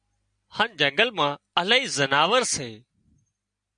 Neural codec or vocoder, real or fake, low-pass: none; real; 9.9 kHz